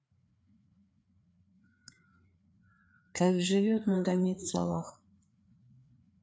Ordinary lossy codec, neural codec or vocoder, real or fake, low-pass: none; codec, 16 kHz, 4 kbps, FreqCodec, larger model; fake; none